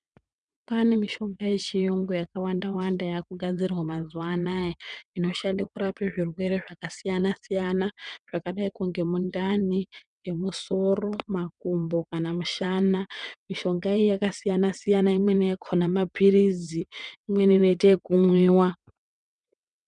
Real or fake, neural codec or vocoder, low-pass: fake; vocoder, 22.05 kHz, 80 mel bands, WaveNeXt; 9.9 kHz